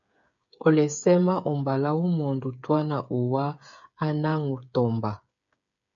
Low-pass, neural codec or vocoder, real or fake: 7.2 kHz; codec, 16 kHz, 8 kbps, FreqCodec, smaller model; fake